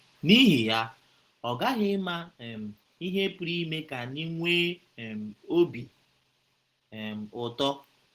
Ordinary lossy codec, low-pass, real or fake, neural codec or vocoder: Opus, 16 kbps; 14.4 kHz; real; none